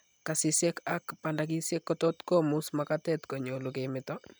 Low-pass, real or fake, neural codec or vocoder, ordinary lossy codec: none; real; none; none